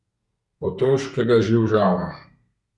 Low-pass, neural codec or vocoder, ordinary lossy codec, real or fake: 10.8 kHz; codec, 32 kHz, 1.9 kbps, SNAC; none; fake